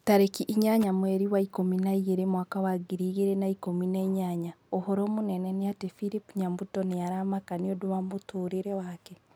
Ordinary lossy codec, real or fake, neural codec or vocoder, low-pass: none; real; none; none